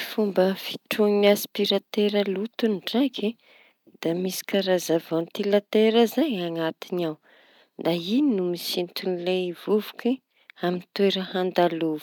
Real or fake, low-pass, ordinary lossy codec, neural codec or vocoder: real; 19.8 kHz; none; none